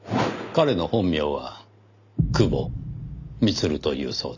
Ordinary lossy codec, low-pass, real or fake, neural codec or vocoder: none; 7.2 kHz; real; none